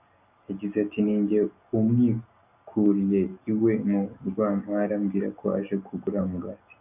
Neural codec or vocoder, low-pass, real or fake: none; 3.6 kHz; real